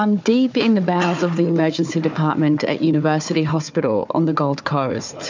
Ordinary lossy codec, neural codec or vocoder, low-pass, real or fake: MP3, 64 kbps; codec, 16 kHz, 4 kbps, FunCodec, trained on Chinese and English, 50 frames a second; 7.2 kHz; fake